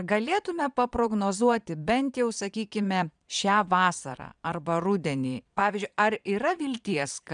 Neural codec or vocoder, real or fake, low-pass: vocoder, 22.05 kHz, 80 mel bands, Vocos; fake; 9.9 kHz